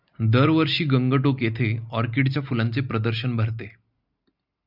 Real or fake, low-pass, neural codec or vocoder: real; 5.4 kHz; none